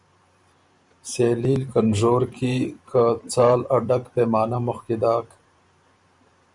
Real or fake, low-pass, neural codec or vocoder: fake; 10.8 kHz; vocoder, 44.1 kHz, 128 mel bands every 512 samples, BigVGAN v2